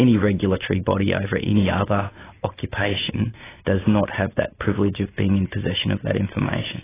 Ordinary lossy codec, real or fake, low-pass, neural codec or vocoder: AAC, 16 kbps; real; 3.6 kHz; none